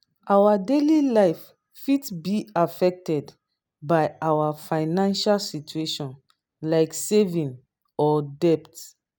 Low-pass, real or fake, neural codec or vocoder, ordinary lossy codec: none; real; none; none